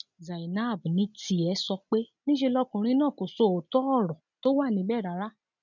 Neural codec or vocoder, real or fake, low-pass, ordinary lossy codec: none; real; 7.2 kHz; none